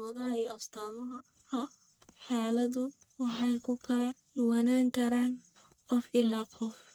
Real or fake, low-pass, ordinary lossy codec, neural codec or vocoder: fake; none; none; codec, 44.1 kHz, 1.7 kbps, Pupu-Codec